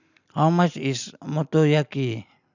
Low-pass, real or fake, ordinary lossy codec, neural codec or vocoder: 7.2 kHz; real; none; none